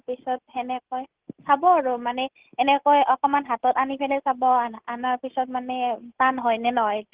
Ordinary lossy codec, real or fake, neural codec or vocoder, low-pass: Opus, 16 kbps; real; none; 3.6 kHz